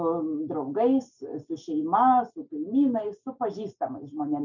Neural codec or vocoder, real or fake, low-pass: none; real; 7.2 kHz